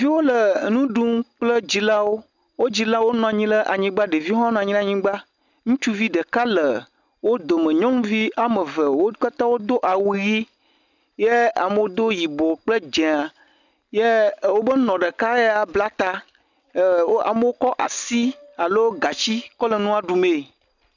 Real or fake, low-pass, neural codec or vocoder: real; 7.2 kHz; none